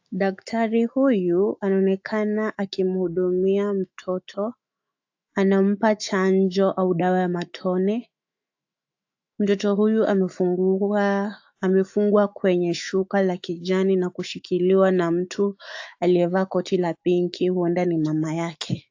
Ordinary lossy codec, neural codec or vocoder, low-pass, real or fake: AAC, 48 kbps; autoencoder, 48 kHz, 128 numbers a frame, DAC-VAE, trained on Japanese speech; 7.2 kHz; fake